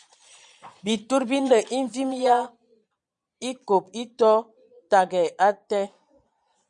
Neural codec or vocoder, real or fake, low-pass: vocoder, 22.05 kHz, 80 mel bands, Vocos; fake; 9.9 kHz